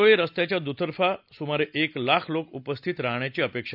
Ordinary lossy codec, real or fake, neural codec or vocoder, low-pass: none; real; none; 5.4 kHz